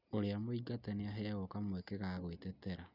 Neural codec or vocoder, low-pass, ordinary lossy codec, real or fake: none; 5.4 kHz; none; real